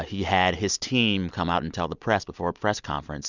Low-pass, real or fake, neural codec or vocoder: 7.2 kHz; real; none